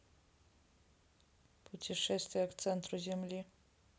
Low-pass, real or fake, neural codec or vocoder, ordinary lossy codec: none; real; none; none